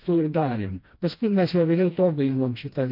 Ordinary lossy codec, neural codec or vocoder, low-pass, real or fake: MP3, 32 kbps; codec, 16 kHz, 1 kbps, FreqCodec, smaller model; 5.4 kHz; fake